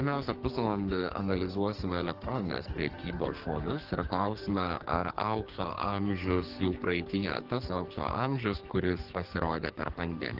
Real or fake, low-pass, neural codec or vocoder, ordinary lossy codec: fake; 5.4 kHz; codec, 44.1 kHz, 2.6 kbps, SNAC; Opus, 16 kbps